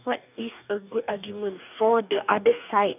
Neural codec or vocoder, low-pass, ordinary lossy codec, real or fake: codec, 44.1 kHz, 2.6 kbps, DAC; 3.6 kHz; none; fake